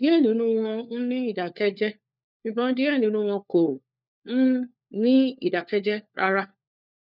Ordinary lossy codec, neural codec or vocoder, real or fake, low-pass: none; codec, 16 kHz, 4 kbps, FunCodec, trained on LibriTTS, 50 frames a second; fake; 5.4 kHz